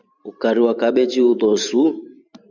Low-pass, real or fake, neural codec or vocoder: 7.2 kHz; fake; vocoder, 44.1 kHz, 128 mel bands every 512 samples, BigVGAN v2